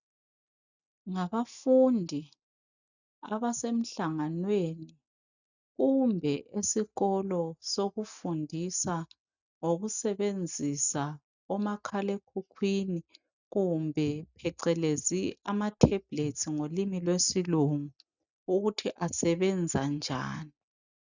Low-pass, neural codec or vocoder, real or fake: 7.2 kHz; none; real